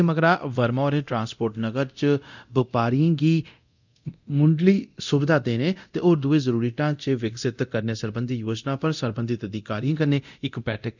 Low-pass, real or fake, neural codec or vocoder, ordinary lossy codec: 7.2 kHz; fake; codec, 24 kHz, 0.9 kbps, DualCodec; none